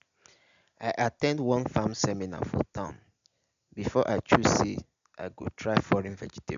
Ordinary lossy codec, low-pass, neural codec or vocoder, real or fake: AAC, 96 kbps; 7.2 kHz; none; real